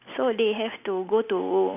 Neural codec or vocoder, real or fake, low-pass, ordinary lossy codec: none; real; 3.6 kHz; none